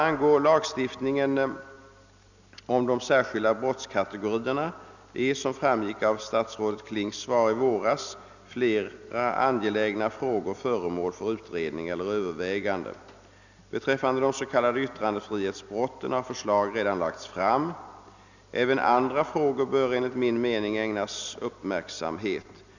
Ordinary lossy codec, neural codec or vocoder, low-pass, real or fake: none; none; 7.2 kHz; real